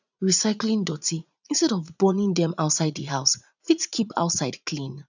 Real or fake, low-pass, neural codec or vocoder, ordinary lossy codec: fake; 7.2 kHz; vocoder, 44.1 kHz, 80 mel bands, Vocos; none